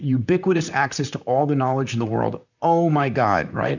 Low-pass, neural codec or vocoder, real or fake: 7.2 kHz; vocoder, 44.1 kHz, 128 mel bands, Pupu-Vocoder; fake